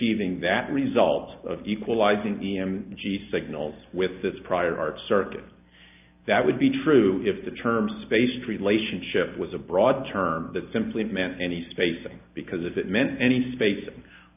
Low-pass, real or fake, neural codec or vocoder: 3.6 kHz; real; none